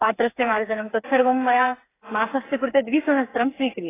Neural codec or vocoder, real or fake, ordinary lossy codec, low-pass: codec, 16 kHz, 4 kbps, FreqCodec, smaller model; fake; AAC, 16 kbps; 3.6 kHz